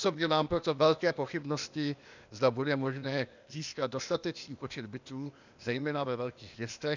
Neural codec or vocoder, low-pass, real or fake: codec, 16 kHz, 0.8 kbps, ZipCodec; 7.2 kHz; fake